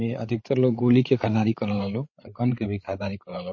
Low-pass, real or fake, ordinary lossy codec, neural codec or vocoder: 7.2 kHz; fake; MP3, 32 kbps; codec, 16 kHz, 8 kbps, FreqCodec, larger model